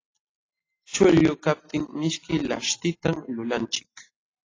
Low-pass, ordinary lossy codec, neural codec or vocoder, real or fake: 7.2 kHz; AAC, 32 kbps; none; real